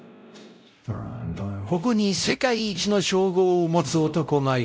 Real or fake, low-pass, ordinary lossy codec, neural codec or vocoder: fake; none; none; codec, 16 kHz, 0.5 kbps, X-Codec, WavLM features, trained on Multilingual LibriSpeech